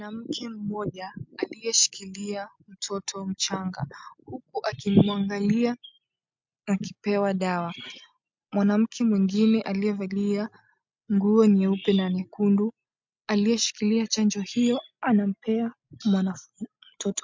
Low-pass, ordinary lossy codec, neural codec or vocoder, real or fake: 7.2 kHz; MP3, 64 kbps; none; real